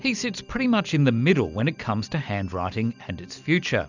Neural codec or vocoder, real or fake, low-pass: none; real; 7.2 kHz